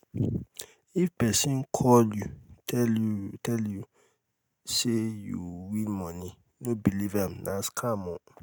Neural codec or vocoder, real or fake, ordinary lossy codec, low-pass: none; real; none; none